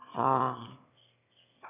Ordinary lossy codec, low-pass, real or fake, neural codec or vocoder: none; 3.6 kHz; fake; autoencoder, 22.05 kHz, a latent of 192 numbers a frame, VITS, trained on one speaker